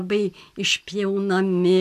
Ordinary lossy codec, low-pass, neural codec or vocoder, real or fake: MP3, 96 kbps; 14.4 kHz; none; real